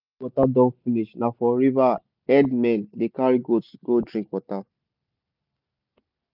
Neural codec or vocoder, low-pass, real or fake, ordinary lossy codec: none; 5.4 kHz; real; none